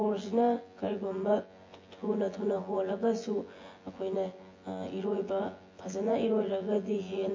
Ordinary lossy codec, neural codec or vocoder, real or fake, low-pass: MP3, 32 kbps; vocoder, 24 kHz, 100 mel bands, Vocos; fake; 7.2 kHz